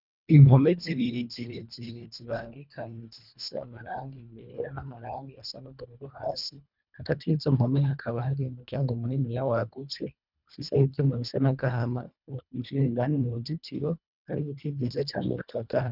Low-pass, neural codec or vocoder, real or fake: 5.4 kHz; codec, 24 kHz, 1.5 kbps, HILCodec; fake